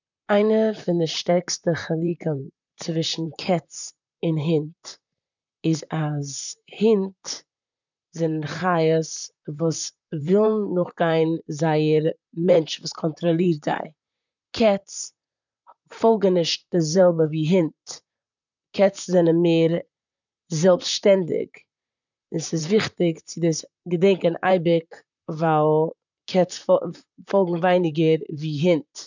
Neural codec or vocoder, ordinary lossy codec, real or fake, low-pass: vocoder, 44.1 kHz, 128 mel bands, Pupu-Vocoder; none; fake; 7.2 kHz